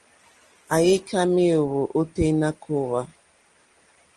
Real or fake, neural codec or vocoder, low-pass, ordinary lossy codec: real; none; 9.9 kHz; Opus, 24 kbps